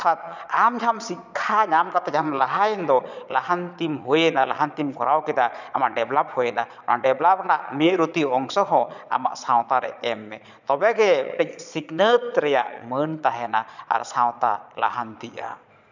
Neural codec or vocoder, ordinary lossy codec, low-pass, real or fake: vocoder, 22.05 kHz, 80 mel bands, Vocos; none; 7.2 kHz; fake